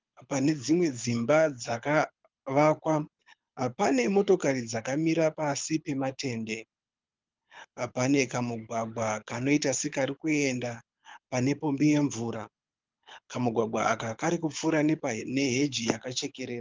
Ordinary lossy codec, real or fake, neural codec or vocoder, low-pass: Opus, 24 kbps; fake; codec, 24 kHz, 6 kbps, HILCodec; 7.2 kHz